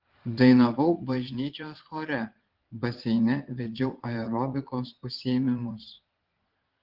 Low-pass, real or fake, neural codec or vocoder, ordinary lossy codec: 5.4 kHz; fake; vocoder, 22.05 kHz, 80 mel bands, Vocos; Opus, 16 kbps